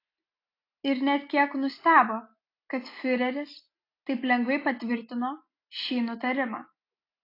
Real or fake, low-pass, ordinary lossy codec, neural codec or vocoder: real; 5.4 kHz; AAC, 32 kbps; none